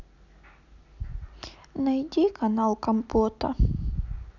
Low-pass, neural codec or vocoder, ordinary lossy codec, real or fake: 7.2 kHz; none; none; real